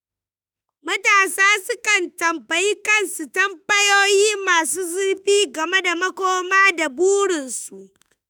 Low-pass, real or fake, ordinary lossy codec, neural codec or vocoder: none; fake; none; autoencoder, 48 kHz, 32 numbers a frame, DAC-VAE, trained on Japanese speech